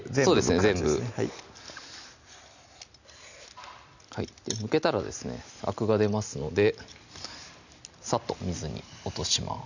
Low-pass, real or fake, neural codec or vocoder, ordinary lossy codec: 7.2 kHz; real; none; none